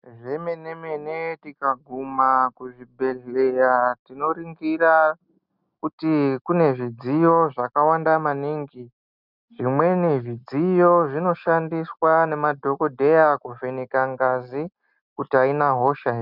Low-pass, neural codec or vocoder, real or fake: 5.4 kHz; none; real